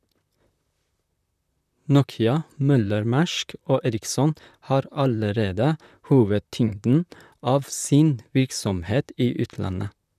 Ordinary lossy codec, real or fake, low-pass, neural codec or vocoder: none; fake; 14.4 kHz; vocoder, 44.1 kHz, 128 mel bands, Pupu-Vocoder